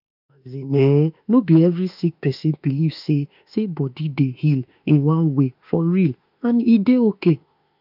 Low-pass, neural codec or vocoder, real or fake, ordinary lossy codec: 5.4 kHz; autoencoder, 48 kHz, 32 numbers a frame, DAC-VAE, trained on Japanese speech; fake; none